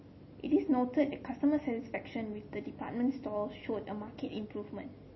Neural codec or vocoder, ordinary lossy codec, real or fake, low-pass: none; MP3, 24 kbps; real; 7.2 kHz